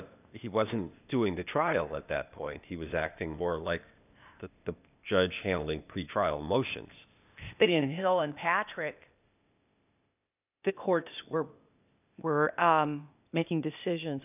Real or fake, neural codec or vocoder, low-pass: fake; codec, 16 kHz, 0.8 kbps, ZipCodec; 3.6 kHz